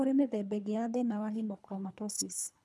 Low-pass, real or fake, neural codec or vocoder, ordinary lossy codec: none; fake; codec, 24 kHz, 3 kbps, HILCodec; none